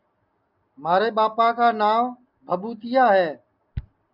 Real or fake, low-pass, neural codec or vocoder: real; 5.4 kHz; none